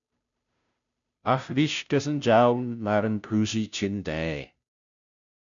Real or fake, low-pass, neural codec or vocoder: fake; 7.2 kHz; codec, 16 kHz, 0.5 kbps, FunCodec, trained on Chinese and English, 25 frames a second